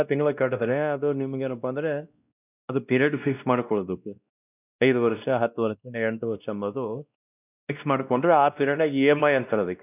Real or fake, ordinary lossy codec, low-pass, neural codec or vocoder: fake; none; 3.6 kHz; codec, 16 kHz, 1 kbps, X-Codec, WavLM features, trained on Multilingual LibriSpeech